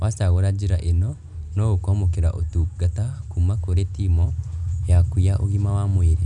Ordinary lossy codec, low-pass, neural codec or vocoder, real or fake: none; 10.8 kHz; none; real